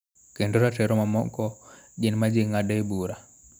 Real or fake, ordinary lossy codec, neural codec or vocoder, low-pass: real; none; none; none